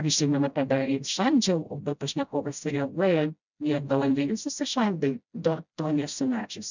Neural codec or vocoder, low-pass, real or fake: codec, 16 kHz, 0.5 kbps, FreqCodec, smaller model; 7.2 kHz; fake